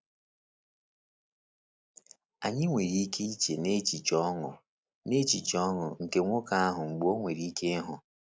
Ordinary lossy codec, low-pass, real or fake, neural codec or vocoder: none; none; real; none